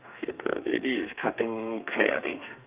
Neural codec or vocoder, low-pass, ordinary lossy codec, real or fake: codec, 32 kHz, 1.9 kbps, SNAC; 3.6 kHz; Opus, 24 kbps; fake